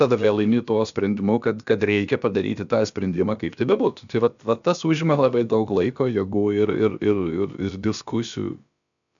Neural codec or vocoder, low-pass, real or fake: codec, 16 kHz, about 1 kbps, DyCAST, with the encoder's durations; 7.2 kHz; fake